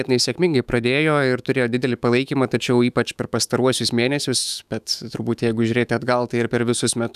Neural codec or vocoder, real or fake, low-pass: autoencoder, 48 kHz, 128 numbers a frame, DAC-VAE, trained on Japanese speech; fake; 14.4 kHz